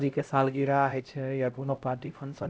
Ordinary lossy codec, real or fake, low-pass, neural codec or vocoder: none; fake; none; codec, 16 kHz, 0.5 kbps, X-Codec, HuBERT features, trained on LibriSpeech